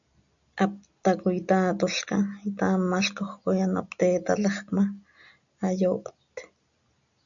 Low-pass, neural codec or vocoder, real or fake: 7.2 kHz; none; real